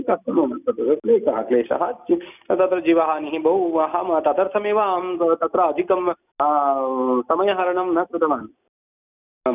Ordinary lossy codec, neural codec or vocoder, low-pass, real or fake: none; none; 3.6 kHz; real